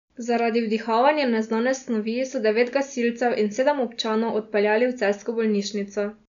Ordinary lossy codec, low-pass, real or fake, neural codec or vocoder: none; 7.2 kHz; real; none